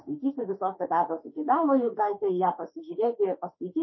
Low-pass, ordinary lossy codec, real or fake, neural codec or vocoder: 7.2 kHz; MP3, 24 kbps; fake; autoencoder, 48 kHz, 32 numbers a frame, DAC-VAE, trained on Japanese speech